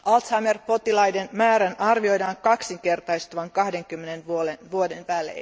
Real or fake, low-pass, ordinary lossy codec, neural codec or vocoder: real; none; none; none